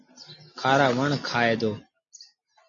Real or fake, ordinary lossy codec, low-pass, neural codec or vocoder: real; MP3, 32 kbps; 7.2 kHz; none